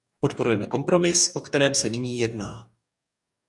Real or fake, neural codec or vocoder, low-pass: fake; codec, 44.1 kHz, 2.6 kbps, DAC; 10.8 kHz